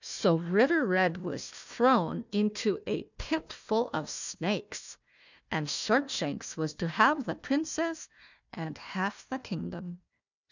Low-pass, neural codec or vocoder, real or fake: 7.2 kHz; codec, 16 kHz, 1 kbps, FunCodec, trained on Chinese and English, 50 frames a second; fake